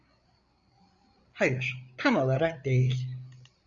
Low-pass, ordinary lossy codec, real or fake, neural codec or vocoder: 7.2 kHz; Opus, 64 kbps; fake; codec, 16 kHz, 8 kbps, FreqCodec, larger model